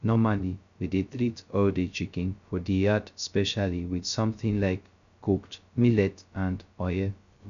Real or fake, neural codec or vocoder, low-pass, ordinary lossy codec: fake; codec, 16 kHz, 0.2 kbps, FocalCodec; 7.2 kHz; none